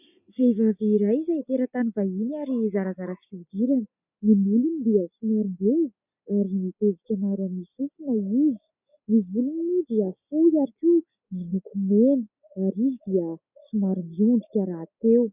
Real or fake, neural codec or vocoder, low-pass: real; none; 3.6 kHz